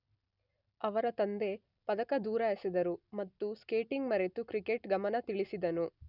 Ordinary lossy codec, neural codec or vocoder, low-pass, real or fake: none; none; 5.4 kHz; real